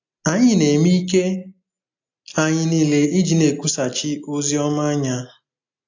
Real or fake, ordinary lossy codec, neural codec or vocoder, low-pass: real; AAC, 48 kbps; none; 7.2 kHz